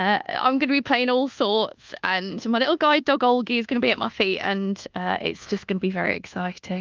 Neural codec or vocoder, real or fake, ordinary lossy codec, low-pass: codec, 24 kHz, 1.2 kbps, DualCodec; fake; Opus, 32 kbps; 7.2 kHz